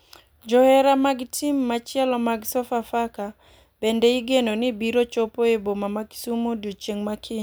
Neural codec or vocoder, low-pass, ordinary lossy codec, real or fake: none; none; none; real